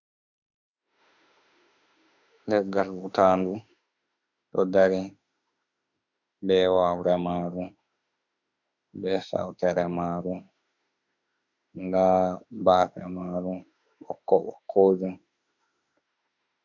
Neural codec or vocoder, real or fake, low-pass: autoencoder, 48 kHz, 32 numbers a frame, DAC-VAE, trained on Japanese speech; fake; 7.2 kHz